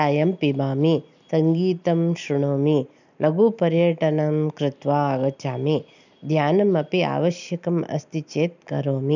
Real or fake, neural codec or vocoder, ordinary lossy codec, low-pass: real; none; none; 7.2 kHz